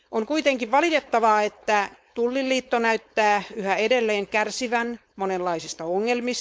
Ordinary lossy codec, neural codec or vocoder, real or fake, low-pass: none; codec, 16 kHz, 4.8 kbps, FACodec; fake; none